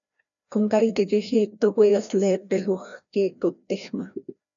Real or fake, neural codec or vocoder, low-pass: fake; codec, 16 kHz, 1 kbps, FreqCodec, larger model; 7.2 kHz